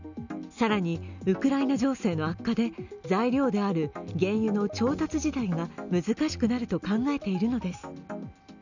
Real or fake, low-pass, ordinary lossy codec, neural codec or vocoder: real; 7.2 kHz; none; none